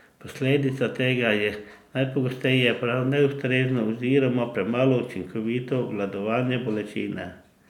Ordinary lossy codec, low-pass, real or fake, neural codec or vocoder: none; 19.8 kHz; real; none